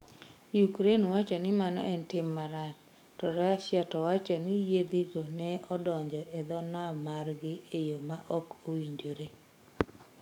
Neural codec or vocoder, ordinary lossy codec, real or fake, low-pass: codec, 44.1 kHz, 7.8 kbps, DAC; none; fake; 19.8 kHz